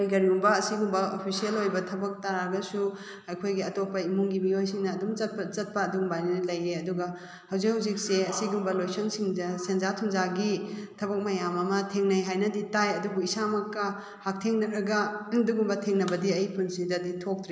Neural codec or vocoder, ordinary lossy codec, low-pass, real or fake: none; none; none; real